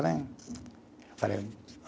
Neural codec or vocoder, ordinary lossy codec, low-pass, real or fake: none; none; none; real